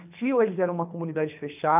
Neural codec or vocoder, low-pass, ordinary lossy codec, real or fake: codec, 24 kHz, 3 kbps, HILCodec; 3.6 kHz; none; fake